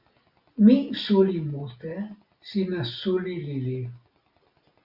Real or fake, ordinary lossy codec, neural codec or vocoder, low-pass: real; Opus, 64 kbps; none; 5.4 kHz